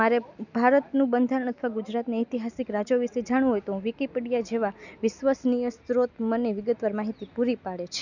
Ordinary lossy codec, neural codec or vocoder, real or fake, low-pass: none; none; real; 7.2 kHz